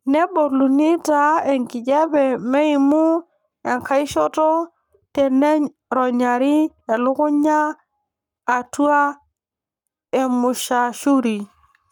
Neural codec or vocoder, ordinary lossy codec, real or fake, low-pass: codec, 44.1 kHz, 7.8 kbps, Pupu-Codec; none; fake; 19.8 kHz